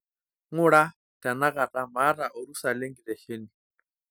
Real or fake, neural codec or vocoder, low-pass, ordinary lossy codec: real; none; none; none